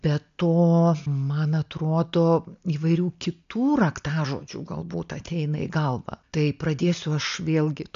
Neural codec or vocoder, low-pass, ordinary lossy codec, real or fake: none; 7.2 kHz; MP3, 64 kbps; real